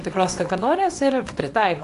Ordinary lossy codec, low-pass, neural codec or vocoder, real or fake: AAC, 48 kbps; 10.8 kHz; codec, 24 kHz, 0.9 kbps, WavTokenizer, small release; fake